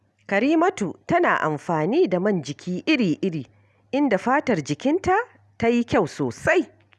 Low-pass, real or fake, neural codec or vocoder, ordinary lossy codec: none; real; none; none